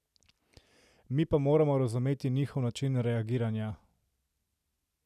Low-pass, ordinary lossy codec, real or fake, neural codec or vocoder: 14.4 kHz; none; real; none